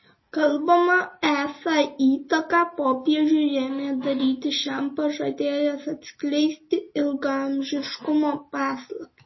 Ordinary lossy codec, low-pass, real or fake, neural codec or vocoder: MP3, 24 kbps; 7.2 kHz; real; none